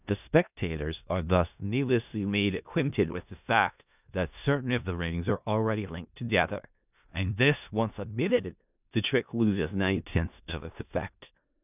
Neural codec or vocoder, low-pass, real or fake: codec, 16 kHz in and 24 kHz out, 0.4 kbps, LongCat-Audio-Codec, four codebook decoder; 3.6 kHz; fake